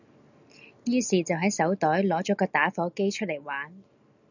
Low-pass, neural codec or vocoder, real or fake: 7.2 kHz; none; real